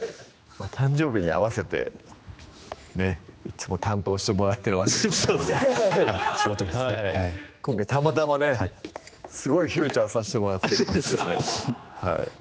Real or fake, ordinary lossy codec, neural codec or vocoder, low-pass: fake; none; codec, 16 kHz, 2 kbps, X-Codec, HuBERT features, trained on general audio; none